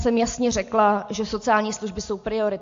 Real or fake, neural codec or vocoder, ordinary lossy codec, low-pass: real; none; AAC, 48 kbps; 7.2 kHz